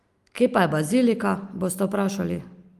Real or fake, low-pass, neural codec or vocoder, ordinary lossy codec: real; 14.4 kHz; none; Opus, 32 kbps